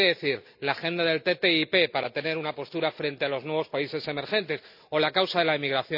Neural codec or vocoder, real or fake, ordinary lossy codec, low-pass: none; real; none; 5.4 kHz